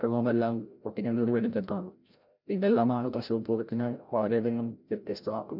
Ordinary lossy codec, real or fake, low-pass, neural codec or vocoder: none; fake; 5.4 kHz; codec, 16 kHz, 0.5 kbps, FreqCodec, larger model